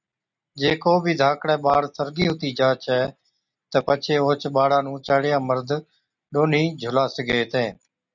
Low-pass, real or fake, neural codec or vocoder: 7.2 kHz; real; none